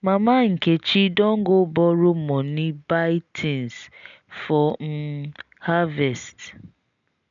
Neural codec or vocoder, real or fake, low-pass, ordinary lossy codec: none; real; 7.2 kHz; none